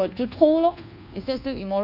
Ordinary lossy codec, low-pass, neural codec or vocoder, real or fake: none; 5.4 kHz; codec, 24 kHz, 1.2 kbps, DualCodec; fake